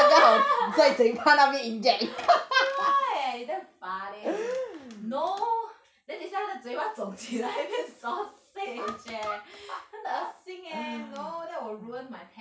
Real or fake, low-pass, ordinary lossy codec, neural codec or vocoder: real; none; none; none